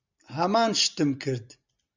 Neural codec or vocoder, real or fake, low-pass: none; real; 7.2 kHz